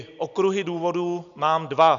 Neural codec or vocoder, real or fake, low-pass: none; real; 7.2 kHz